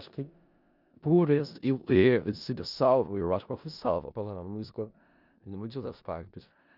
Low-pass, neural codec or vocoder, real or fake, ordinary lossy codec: 5.4 kHz; codec, 16 kHz in and 24 kHz out, 0.4 kbps, LongCat-Audio-Codec, four codebook decoder; fake; none